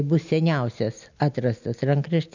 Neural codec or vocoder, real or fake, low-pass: none; real; 7.2 kHz